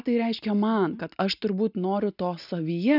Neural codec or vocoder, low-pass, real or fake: none; 5.4 kHz; real